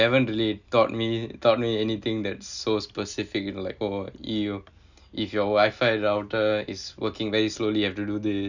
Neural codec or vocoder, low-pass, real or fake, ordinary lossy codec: none; 7.2 kHz; real; none